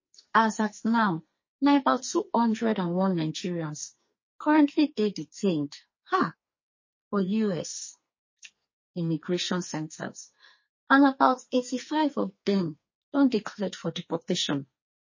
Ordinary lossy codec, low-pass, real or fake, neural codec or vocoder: MP3, 32 kbps; 7.2 kHz; fake; codec, 44.1 kHz, 2.6 kbps, SNAC